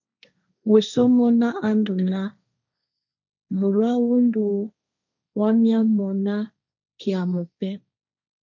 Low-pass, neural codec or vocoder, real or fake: 7.2 kHz; codec, 16 kHz, 1.1 kbps, Voila-Tokenizer; fake